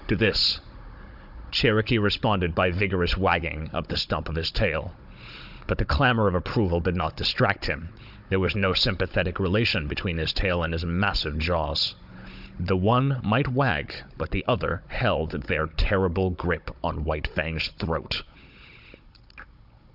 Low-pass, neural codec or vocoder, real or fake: 5.4 kHz; codec, 16 kHz, 16 kbps, FunCodec, trained on Chinese and English, 50 frames a second; fake